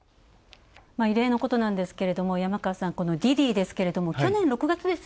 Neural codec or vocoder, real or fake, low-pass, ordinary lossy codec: none; real; none; none